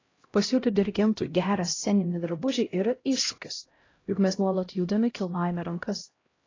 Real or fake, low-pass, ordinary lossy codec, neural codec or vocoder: fake; 7.2 kHz; AAC, 32 kbps; codec, 16 kHz, 0.5 kbps, X-Codec, HuBERT features, trained on LibriSpeech